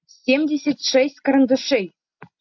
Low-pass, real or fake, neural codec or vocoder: 7.2 kHz; real; none